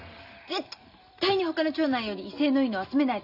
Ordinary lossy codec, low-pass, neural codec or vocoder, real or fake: none; 5.4 kHz; none; real